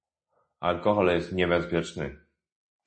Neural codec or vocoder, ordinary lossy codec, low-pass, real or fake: none; MP3, 32 kbps; 9.9 kHz; real